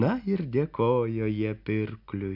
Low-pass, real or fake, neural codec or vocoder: 5.4 kHz; real; none